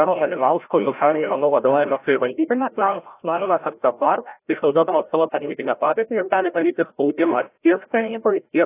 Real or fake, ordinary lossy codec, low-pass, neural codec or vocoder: fake; AAC, 24 kbps; 3.6 kHz; codec, 16 kHz, 0.5 kbps, FreqCodec, larger model